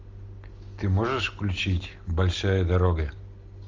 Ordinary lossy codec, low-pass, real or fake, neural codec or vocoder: Opus, 32 kbps; 7.2 kHz; real; none